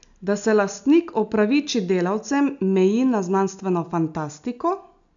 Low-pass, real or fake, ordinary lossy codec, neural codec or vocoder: 7.2 kHz; real; none; none